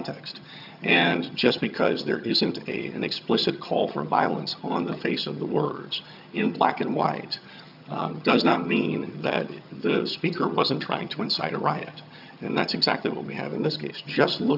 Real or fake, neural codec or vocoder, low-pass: fake; vocoder, 22.05 kHz, 80 mel bands, HiFi-GAN; 5.4 kHz